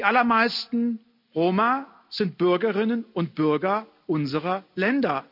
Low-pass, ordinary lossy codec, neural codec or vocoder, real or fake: 5.4 kHz; none; none; real